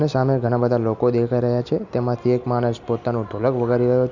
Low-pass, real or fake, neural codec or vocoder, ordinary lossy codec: 7.2 kHz; real; none; none